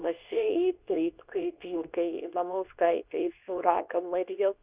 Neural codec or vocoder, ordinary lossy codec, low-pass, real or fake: codec, 24 kHz, 0.9 kbps, WavTokenizer, medium speech release version 2; Opus, 64 kbps; 3.6 kHz; fake